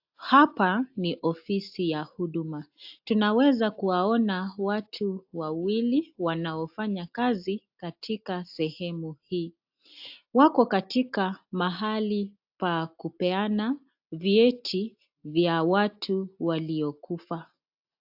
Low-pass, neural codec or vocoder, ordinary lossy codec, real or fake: 5.4 kHz; none; AAC, 48 kbps; real